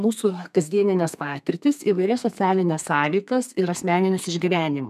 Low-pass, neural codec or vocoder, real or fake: 14.4 kHz; codec, 32 kHz, 1.9 kbps, SNAC; fake